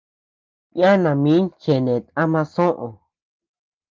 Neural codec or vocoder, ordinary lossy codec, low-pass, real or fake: none; Opus, 32 kbps; 7.2 kHz; real